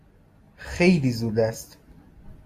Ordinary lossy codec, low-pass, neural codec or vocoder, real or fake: AAC, 64 kbps; 14.4 kHz; none; real